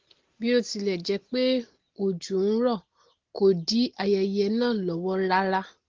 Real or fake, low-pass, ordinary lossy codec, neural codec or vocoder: real; 7.2 kHz; Opus, 16 kbps; none